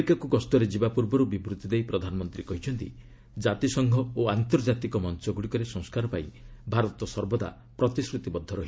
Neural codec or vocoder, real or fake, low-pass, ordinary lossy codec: none; real; none; none